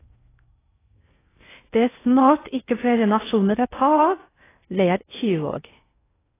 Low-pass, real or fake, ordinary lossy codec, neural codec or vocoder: 3.6 kHz; fake; AAC, 16 kbps; codec, 16 kHz in and 24 kHz out, 0.6 kbps, FocalCodec, streaming, 4096 codes